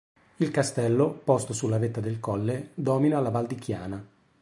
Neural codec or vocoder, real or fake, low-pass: none; real; 10.8 kHz